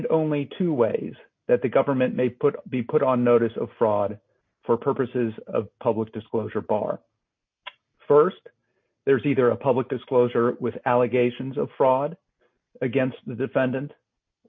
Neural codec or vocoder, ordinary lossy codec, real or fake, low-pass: none; MP3, 32 kbps; real; 7.2 kHz